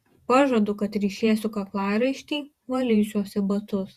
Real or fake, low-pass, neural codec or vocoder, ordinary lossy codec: fake; 14.4 kHz; vocoder, 48 kHz, 128 mel bands, Vocos; Opus, 64 kbps